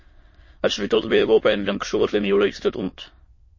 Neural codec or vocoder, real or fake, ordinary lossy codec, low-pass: autoencoder, 22.05 kHz, a latent of 192 numbers a frame, VITS, trained on many speakers; fake; MP3, 32 kbps; 7.2 kHz